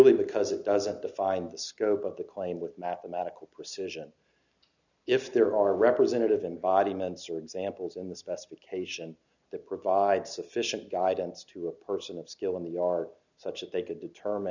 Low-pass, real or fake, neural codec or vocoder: 7.2 kHz; real; none